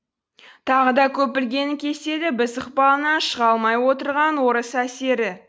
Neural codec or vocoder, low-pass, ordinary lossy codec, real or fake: none; none; none; real